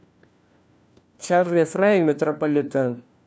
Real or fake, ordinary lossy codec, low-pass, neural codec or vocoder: fake; none; none; codec, 16 kHz, 1 kbps, FunCodec, trained on LibriTTS, 50 frames a second